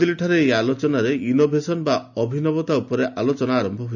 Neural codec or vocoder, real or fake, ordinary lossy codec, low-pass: none; real; none; 7.2 kHz